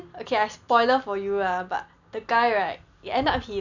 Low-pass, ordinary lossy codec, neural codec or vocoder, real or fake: 7.2 kHz; none; none; real